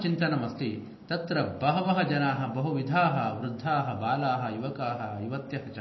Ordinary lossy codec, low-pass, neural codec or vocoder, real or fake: MP3, 24 kbps; 7.2 kHz; none; real